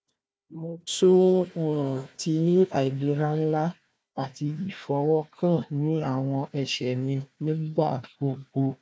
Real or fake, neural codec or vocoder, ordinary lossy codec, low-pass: fake; codec, 16 kHz, 1 kbps, FunCodec, trained on Chinese and English, 50 frames a second; none; none